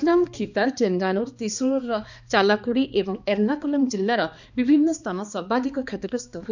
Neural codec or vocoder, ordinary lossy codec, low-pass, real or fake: codec, 16 kHz, 2 kbps, X-Codec, HuBERT features, trained on balanced general audio; none; 7.2 kHz; fake